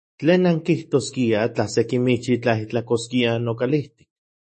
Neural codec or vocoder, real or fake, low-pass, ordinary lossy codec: autoencoder, 48 kHz, 128 numbers a frame, DAC-VAE, trained on Japanese speech; fake; 10.8 kHz; MP3, 32 kbps